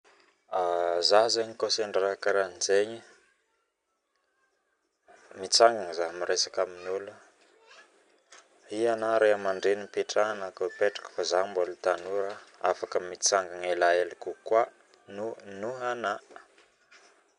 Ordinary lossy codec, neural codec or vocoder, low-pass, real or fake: none; none; 9.9 kHz; real